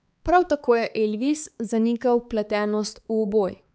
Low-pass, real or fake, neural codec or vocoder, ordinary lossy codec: none; fake; codec, 16 kHz, 4 kbps, X-Codec, HuBERT features, trained on balanced general audio; none